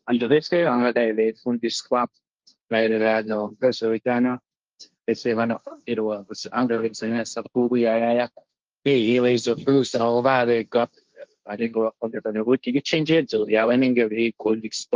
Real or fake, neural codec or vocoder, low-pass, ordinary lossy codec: fake; codec, 16 kHz, 1.1 kbps, Voila-Tokenizer; 7.2 kHz; Opus, 24 kbps